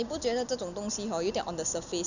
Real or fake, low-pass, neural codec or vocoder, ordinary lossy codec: real; 7.2 kHz; none; none